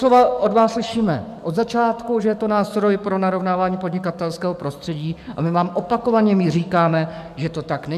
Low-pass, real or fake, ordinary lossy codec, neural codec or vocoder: 14.4 kHz; fake; MP3, 96 kbps; codec, 44.1 kHz, 7.8 kbps, DAC